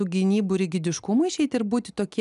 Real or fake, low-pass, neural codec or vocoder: real; 10.8 kHz; none